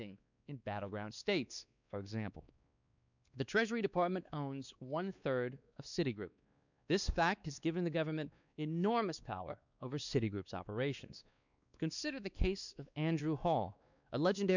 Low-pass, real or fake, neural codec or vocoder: 7.2 kHz; fake; codec, 16 kHz, 2 kbps, X-Codec, WavLM features, trained on Multilingual LibriSpeech